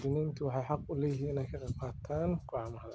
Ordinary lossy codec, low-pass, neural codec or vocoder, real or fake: none; none; none; real